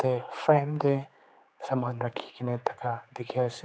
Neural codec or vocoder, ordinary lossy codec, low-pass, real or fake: codec, 16 kHz, 4 kbps, X-Codec, HuBERT features, trained on general audio; none; none; fake